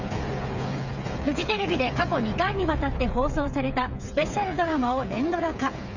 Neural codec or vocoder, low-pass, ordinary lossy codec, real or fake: codec, 16 kHz, 8 kbps, FreqCodec, smaller model; 7.2 kHz; none; fake